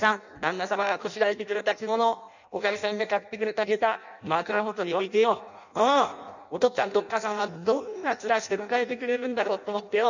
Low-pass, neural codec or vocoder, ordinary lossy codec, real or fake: 7.2 kHz; codec, 16 kHz in and 24 kHz out, 0.6 kbps, FireRedTTS-2 codec; none; fake